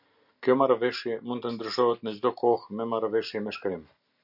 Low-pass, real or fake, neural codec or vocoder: 5.4 kHz; real; none